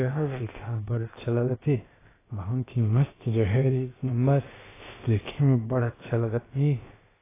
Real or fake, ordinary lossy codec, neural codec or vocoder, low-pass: fake; AAC, 16 kbps; codec, 16 kHz, about 1 kbps, DyCAST, with the encoder's durations; 3.6 kHz